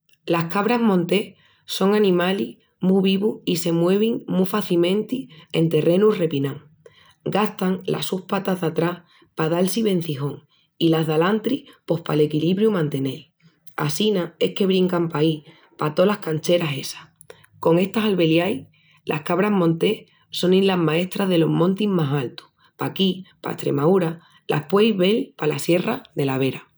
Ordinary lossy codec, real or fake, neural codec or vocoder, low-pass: none; real; none; none